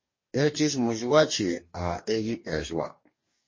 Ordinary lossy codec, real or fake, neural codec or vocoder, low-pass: MP3, 32 kbps; fake; codec, 44.1 kHz, 2.6 kbps, DAC; 7.2 kHz